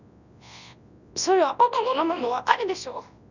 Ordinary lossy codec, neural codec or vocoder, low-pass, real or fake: none; codec, 24 kHz, 0.9 kbps, WavTokenizer, large speech release; 7.2 kHz; fake